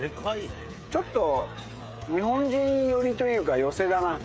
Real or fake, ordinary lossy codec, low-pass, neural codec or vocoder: fake; none; none; codec, 16 kHz, 16 kbps, FreqCodec, smaller model